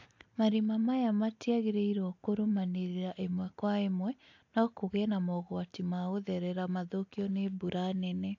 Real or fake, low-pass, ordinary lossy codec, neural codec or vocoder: real; 7.2 kHz; AAC, 48 kbps; none